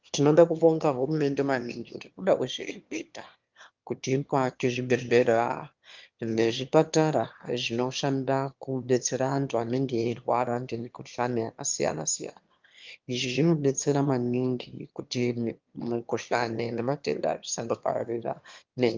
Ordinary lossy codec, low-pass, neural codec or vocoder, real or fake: Opus, 32 kbps; 7.2 kHz; autoencoder, 22.05 kHz, a latent of 192 numbers a frame, VITS, trained on one speaker; fake